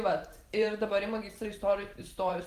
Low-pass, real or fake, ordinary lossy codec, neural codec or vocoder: 14.4 kHz; fake; Opus, 24 kbps; vocoder, 44.1 kHz, 128 mel bands every 512 samples, BigVGAN v2